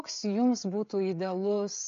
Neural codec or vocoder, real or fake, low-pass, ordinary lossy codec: codec, 16 kHz, 16 kbps, FreqCodec, smaller model; fake; 7.2 kHz; AAC, 48 kbps